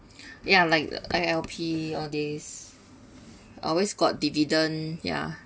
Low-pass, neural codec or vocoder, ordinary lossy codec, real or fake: none; none; none; real